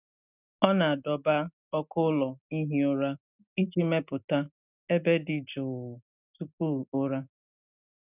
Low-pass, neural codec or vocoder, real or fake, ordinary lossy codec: 3.6 kHz; none; real; none